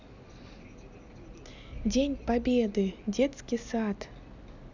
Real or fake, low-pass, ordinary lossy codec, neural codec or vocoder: real; 7.2 kHz; none; none